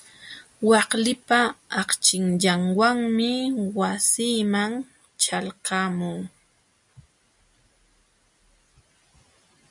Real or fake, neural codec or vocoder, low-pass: real; none; 10.8 kHz